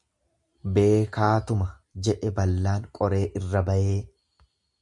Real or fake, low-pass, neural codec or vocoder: real; 10.8 kHz; none